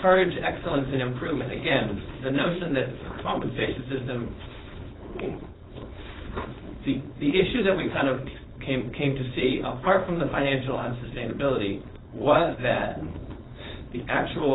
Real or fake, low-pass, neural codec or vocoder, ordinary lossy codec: fake; 7.2 kHz; codec, 16 kHz, 4.8 kbps, FACodec; AAC, 16 kbps